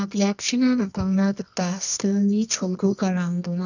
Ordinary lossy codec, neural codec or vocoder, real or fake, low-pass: none; codec, 24 kHz, 0.9 kbps, WavTokenizer, medium music audio release; fake; 7.2 kHz